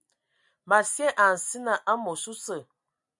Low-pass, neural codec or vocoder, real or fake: 10.8 kHz; none; real